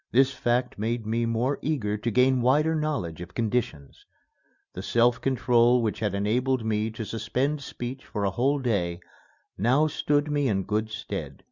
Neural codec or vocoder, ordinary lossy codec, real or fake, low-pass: none; Opus, 64 kbps; real; 7.2 kHz